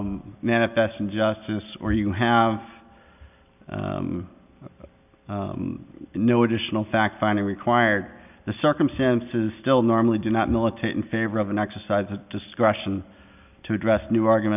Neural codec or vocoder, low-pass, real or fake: none; 3.6 kHz; real